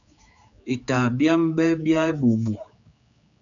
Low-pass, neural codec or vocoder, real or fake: 7.2 kHz; codec, 16 kHz, 4 kbps, X-Codec, HuBERT features, trained on general audio; fake